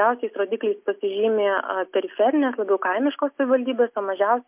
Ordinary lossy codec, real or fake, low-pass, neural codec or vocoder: MP3, 32 kbps; real; 3.6 kHz; none